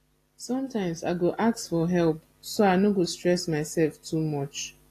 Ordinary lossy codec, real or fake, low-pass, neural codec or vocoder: AAC, 48 kbps; real; 14.4 kHz; none